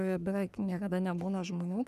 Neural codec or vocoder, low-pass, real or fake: codec, 44.1 kHz, 3.4 kbps, Pupu-Codec; 14.4 kHz; fake